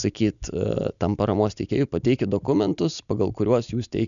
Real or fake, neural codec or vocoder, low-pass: real; none; 7.2 kHz